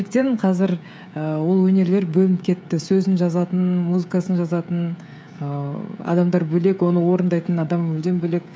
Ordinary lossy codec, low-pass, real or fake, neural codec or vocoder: none; none; fake; codec, 16 kHz, 16 kbps, FreqCodec, smaller model